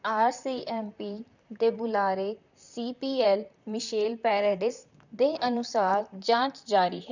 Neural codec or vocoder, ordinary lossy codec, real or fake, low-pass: vocoder, 44.1 kHz, 128 mel bands, Pupu-Vocoder; none; fake; 7.2 kHz